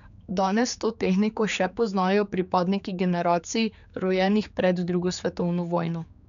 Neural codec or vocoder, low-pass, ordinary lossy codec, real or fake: codec, 16 kHz, 4 kbps, X-Codec, HuBERT features, trained on general audio; 7.2 kHz; none; fake